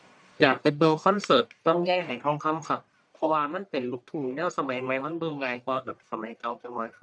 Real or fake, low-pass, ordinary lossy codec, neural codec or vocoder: fake; 9.9 kHz; none; codec, 44.1 kHz, 1.7 kbps, Pupu-Codec